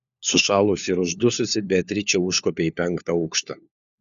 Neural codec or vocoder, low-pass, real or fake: codec, 16 kHz, 4 kbps, FunCodec, trained on LibriTTS, 50 frames a second; 7.2 kHz; fake